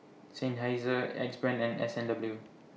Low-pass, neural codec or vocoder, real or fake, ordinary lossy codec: none; none; real; none